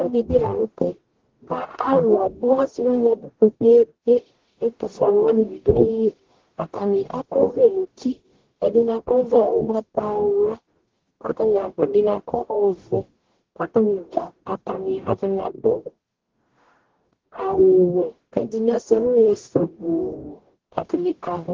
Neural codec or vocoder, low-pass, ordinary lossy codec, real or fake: codec, 44.1 kHz, 0.9 kbps, DAC; 7.2 kHz; Opus, 16 kbps; fake